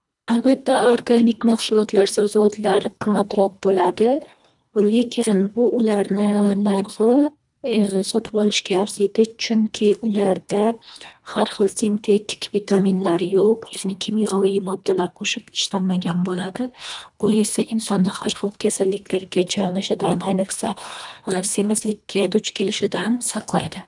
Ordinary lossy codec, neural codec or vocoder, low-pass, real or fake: none; codec, 24 kHz, 1.5 kbps, HILCodec; 10.8 kHz; fake